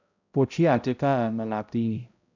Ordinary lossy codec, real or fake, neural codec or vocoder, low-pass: none; fake; codec, 16 kHz, 0.5 kbps, X-Codec, HuBERT features, trained on balanced general audio; 7.2 kHz